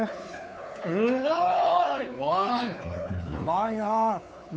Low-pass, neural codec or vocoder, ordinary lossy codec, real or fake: none; codec, 16 kHz, 4 kbps, X-Codec, WavLM features, trained on Multilingual LibriSpeech; none; fake